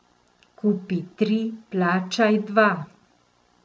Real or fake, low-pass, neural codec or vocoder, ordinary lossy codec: real; none; none; none